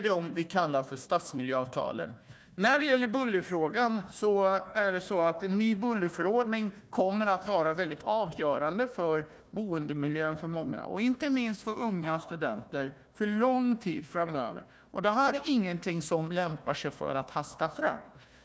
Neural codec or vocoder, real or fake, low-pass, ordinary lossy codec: codec, 16 kHz, 1 kbps, FunCodec, trained on Chinese and English, 50 frames a second; fake; none; none